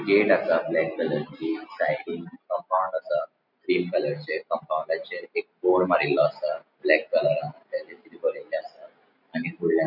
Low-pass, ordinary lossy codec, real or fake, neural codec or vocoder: 5.4 kHz; none; real; none